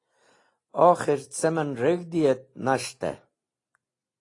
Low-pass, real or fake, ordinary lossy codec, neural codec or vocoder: 10.8 kHz; real; AAC, 32 kbps; none